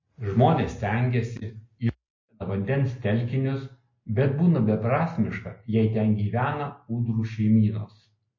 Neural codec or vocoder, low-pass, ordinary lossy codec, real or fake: none; 7.2 kHz; MP3, 32 kbps; real